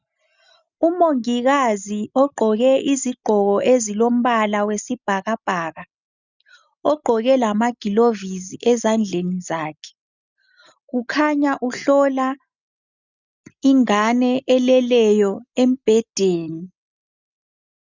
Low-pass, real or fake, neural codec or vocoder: 7.2 kHz; real; none